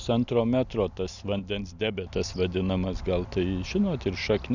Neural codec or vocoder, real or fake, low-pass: none; real; 7.2 kHz